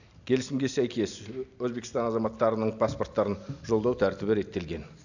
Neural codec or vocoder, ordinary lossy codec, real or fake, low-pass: none; none; real; 7.2 kHz